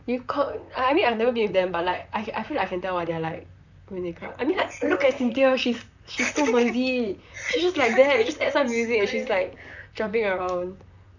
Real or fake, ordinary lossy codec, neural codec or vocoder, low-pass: fake; none; vocoder, 44.1 kHz, 128 mel bands, Pupu-Vocoder; 7.2 kHz